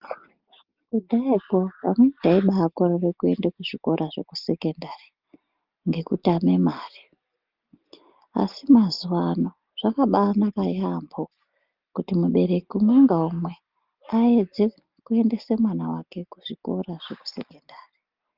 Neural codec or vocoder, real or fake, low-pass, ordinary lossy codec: none; real; 5.4 kHz; Opus, 32 kbps